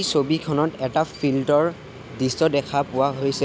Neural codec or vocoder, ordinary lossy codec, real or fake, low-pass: none; none; real; none